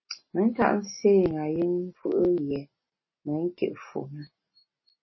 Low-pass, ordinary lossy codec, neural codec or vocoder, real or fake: 7.2 kHz; MP3, 24 kbps; none; real